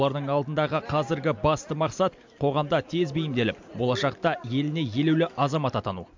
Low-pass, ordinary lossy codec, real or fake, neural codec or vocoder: 7.2 kHz; MP3, 64 kbps; real; none